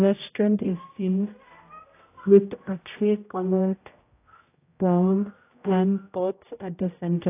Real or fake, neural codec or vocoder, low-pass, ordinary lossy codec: fake; codec, 16 kHz, 0.5 kbps, X-Codec, HuBERT features, trained on general audio; 3.6 kHz; AAC, 24 kbps